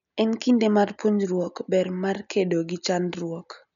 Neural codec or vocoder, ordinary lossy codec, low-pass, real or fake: none; none; 7.2 kHz; real